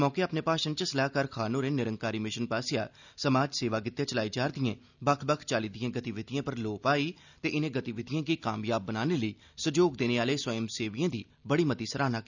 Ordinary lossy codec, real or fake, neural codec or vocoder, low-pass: none; real; none; 7.2 kHz